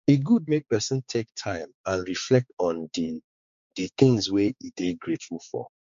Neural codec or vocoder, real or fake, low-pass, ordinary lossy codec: codec, 16 kHz, 4 kbps, X-Codec, HuBERT features, trained on balanced general audio; fake; 7.2 kHz; MP3, 48 kbps